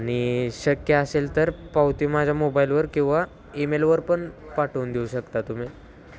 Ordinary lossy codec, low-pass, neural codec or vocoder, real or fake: none; none; none; real